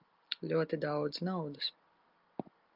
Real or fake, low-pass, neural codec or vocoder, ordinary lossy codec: real; 5.4 kHz; none; Opus, 32 kbps